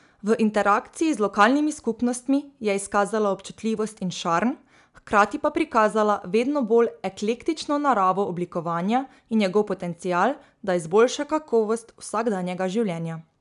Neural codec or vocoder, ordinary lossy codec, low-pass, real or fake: none; none; 10.8 kHz; real